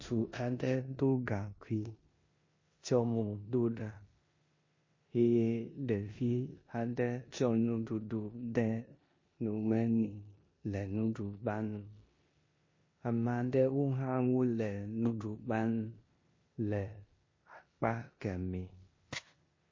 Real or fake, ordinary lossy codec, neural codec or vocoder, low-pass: fake; MP3, 32 kbps; codec, 16 kHz in and 24 kHz out, 0.9 kbps, LongCat-Audio-Codec, four codebook decoder; 7.2 kHz